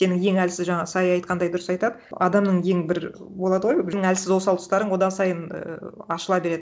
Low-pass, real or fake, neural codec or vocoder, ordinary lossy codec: none; real; none; none